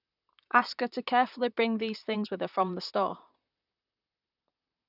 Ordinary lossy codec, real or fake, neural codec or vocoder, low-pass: none; fake; vocoder, 44.1 kHz, 128 mel bands, Pupu-Vocoder; 5.4 kHz